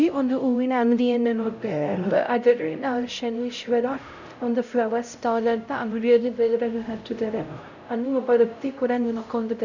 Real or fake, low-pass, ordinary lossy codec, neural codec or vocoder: fake; 7.2 kHz; none; codec, 16 kHz, 0.5 kbps, X-Codec, HuBERT features, trained on LibriSpeech